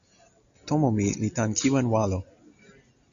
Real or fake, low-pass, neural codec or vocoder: real; 7.2 kHz; none